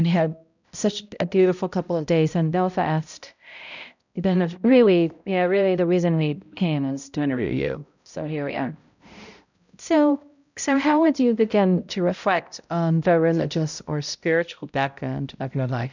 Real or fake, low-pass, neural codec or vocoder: fake; 7.2 kHz; codec, 16 kHz, 0.5 kbps, X-Codec, HuBERT features, trained on balanced general audio